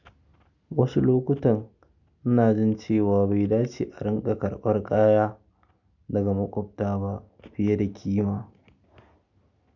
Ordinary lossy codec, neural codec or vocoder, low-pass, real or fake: none; none; 7.2 kHz; real